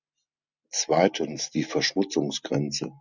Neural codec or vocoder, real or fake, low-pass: none; real; 7.2 kHz